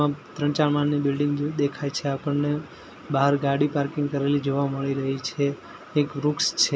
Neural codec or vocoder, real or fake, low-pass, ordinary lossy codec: none; real; none; none